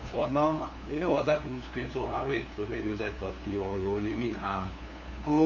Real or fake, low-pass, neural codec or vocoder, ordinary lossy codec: fake; 7.2 kHz; codec, 16 kHz, 2 kbps, FunCodec, trained on LibriTTS, 25 frames a second; none